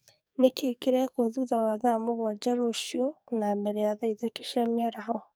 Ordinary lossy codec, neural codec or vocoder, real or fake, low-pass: none; codec, 44.1 kHz, 2.6 kbps, SNAC; fake; none